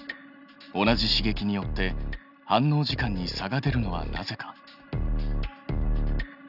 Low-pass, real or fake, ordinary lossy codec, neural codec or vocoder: 5.4 kHz; real; none; none